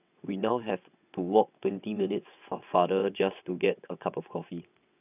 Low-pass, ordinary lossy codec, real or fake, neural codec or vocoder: 3.6 kHz; none; fake; vocoder, 22.05 kHz, 80 mel bands, WaveNeXt